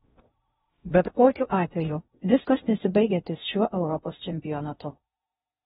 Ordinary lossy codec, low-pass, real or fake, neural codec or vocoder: AAC, 16 kbps; 10.8 kHz; fake; codec, 16 kHz in and 24 kHz out, 0.6 kbps, FocalCodec, streaming, 2048 codes